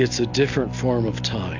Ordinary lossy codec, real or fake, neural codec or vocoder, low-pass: AAC, 48 kbps; real; none; 7.2 kHz